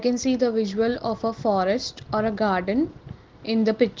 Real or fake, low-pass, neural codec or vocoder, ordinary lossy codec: real; 7.2 kHz; none; Opus, 16 kbps